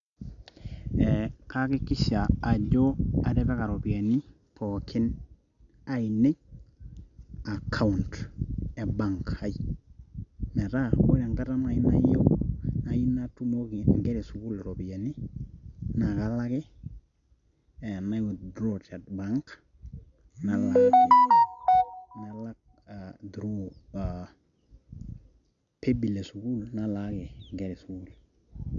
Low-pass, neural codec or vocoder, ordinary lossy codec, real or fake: 7.2 kHz; none; none; real